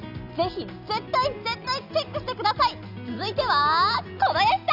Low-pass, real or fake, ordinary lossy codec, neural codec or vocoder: 5.4 kHz; real; none; none